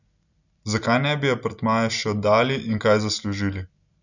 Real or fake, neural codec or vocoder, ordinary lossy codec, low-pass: real; none; none; 7.2 kHz